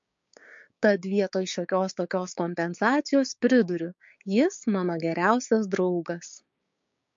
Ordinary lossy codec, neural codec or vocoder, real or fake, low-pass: MP3, 48 kbps; codec, 16 kHz, 6 kbps, DAC; fake; 7.2 kHz